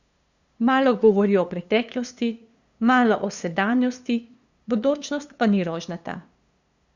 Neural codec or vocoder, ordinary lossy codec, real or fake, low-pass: codec, 16 kHz, 2 kbps, FunCodec, trained on LibriTTS, 25 frames a second; Opus, 64 kbps; fake; 7.2 kHz